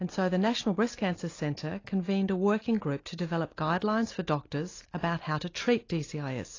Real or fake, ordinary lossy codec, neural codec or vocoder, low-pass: real; AAC, 32 kbps; none; 7.2 kHz